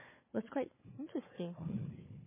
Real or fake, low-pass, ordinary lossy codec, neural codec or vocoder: fake; 3.6 kHz; MP3, 16 kbps; codec, 16 kHz, 4 kbps, FunCodec, trained on Chinese and English, 50 frames a second